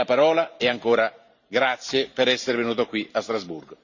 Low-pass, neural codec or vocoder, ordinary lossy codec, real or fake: 7.2 kHz; vocoder, 44.1 kHz, 128 mel bands every 512 samples, BigVGAN v2; none; fake